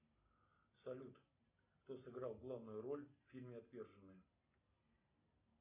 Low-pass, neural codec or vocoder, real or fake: 3.6 kHz; none; real